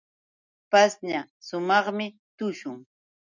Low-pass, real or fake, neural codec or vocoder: 7.2 kHz; real; none